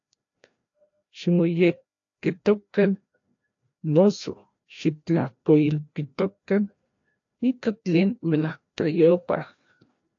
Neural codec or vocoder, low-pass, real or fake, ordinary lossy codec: codec, 16 kHz, 1 kbps, FreqCodec, larger model; 7.2 kHz; fake; AAC, 48 kbps